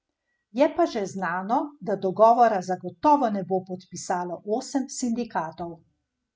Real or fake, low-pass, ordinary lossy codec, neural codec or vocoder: real; none; none; none